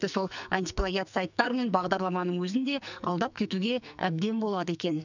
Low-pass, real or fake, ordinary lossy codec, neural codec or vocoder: 7.2 kHz; fake; none; codec, 44.1 kHz, 2.6 kbps, SNAC